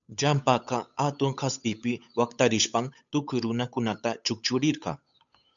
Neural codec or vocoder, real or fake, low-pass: codec, 16 kHz, 8 kbps, FunCodec, trained on Chinese and English, 25 frames a second; fake; 7.2 kHz